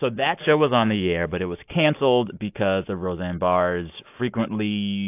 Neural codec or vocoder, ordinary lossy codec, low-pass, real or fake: none; AAC, 32 kbps; 3.6 kHz; real